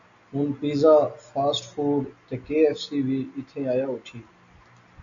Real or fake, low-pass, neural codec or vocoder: real; 7.2 kHz; none